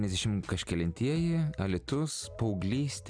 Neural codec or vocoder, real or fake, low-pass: none; real; 9.9 kHz